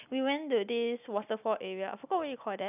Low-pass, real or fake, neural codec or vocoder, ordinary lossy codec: 3.6 kHz; real; none; none